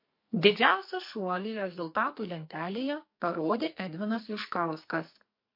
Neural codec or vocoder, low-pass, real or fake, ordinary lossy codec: codec, 44.1 kHz, 2.6 kbps, SNAC; 5.4 kHz; fake; MP3, 32 kbps